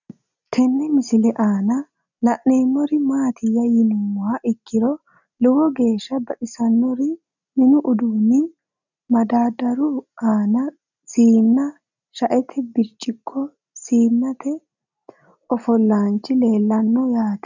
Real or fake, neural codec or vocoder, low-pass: real; none; 7.2 kHz